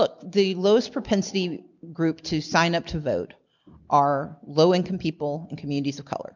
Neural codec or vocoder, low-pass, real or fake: none; 7.2 kHz; real